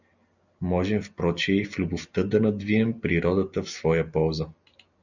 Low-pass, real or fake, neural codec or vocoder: 7.2 kHz; real; none